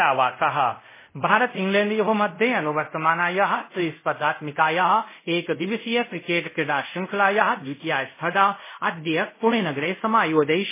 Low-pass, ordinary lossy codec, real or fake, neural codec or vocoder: 3.6 kHz; MP3, 16 kbps; fake; codec, 24 kHz, 0.5 kbps, DualCodec